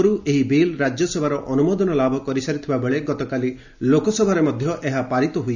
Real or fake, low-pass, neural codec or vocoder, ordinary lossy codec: real; 7.2 kHz; none; none